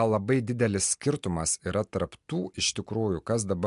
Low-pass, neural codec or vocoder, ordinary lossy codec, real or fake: 10.8 kHz; none; MP3, 64 kbps; real